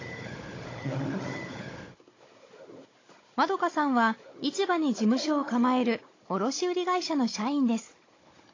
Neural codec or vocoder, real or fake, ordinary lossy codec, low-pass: codec, 16 kHz, 16 kbps, FunCodec, trained on Chinese and English, 50 frames a second; fake; AAC, 32 kbps; 7.2 kHz